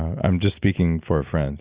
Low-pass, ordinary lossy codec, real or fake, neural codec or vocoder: 3.6 kHz; Opus, 64 kbps; real; none